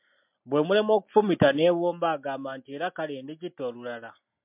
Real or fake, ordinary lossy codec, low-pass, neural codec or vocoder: real; MP3, 32 kbps; 3.6 kHz; none